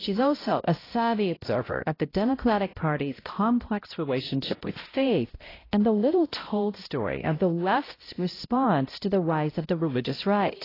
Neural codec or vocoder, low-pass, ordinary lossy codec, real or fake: codec, 16 kHz, 0.5 kbps, X-Codec, HuBERT features, trained on balanced general audio; 5.4 kHz; AAC, 24 kbps; fake